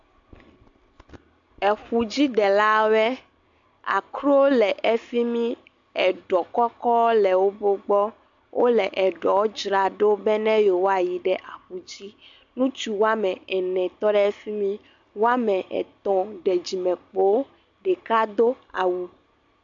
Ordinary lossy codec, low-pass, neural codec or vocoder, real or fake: AAC, 64 kbps; 7.2 kHz; none; real